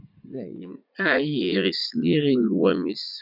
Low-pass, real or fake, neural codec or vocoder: 5.4 kHz; fake; vocoder, 44.1 kHz, 80 mel bands, Vocos